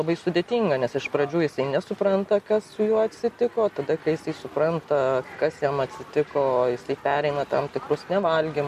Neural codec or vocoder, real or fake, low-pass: none; real; 14.4 kHz